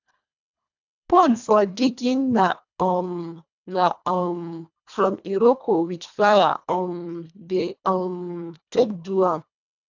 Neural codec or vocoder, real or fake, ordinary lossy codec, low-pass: codec, 24 kHz, 1.5 kbps, HILCodec; fake; none; 7.2 kHz